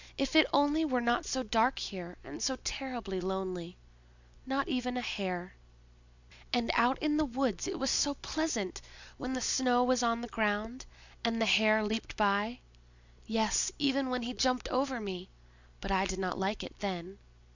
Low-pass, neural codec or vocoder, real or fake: 7.2 kHz; none; real